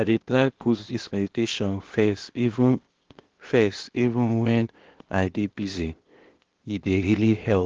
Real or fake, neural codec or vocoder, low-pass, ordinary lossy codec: fake; codec, 16 kHz, 0.8 kbps, ZipCodec; 7.2 kHz; Opus, 16 kbps